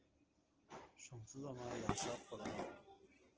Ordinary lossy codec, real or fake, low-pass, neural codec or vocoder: Opus, 16 kbps; real; 7.2 kHz; none